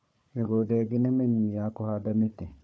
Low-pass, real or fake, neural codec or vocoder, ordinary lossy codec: none; fake; codec, 16 kHz, 4 kbps, FunCodec, trained on Chinese and English, 50 frames a second; none